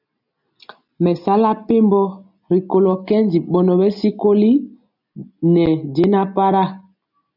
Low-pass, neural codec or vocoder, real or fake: 5.4 kHz; none; real